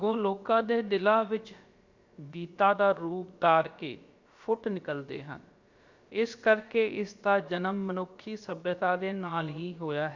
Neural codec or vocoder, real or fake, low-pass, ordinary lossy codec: codec, 16 kHz, about 1 kbps, DyCAST, with the encoder's durations; fake; 7.2 kHz; Opus, 64 kbps